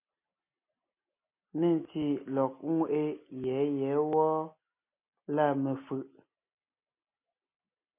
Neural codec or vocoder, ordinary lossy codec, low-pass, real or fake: none; MP3, 24 kbps; 3.6 kHz; real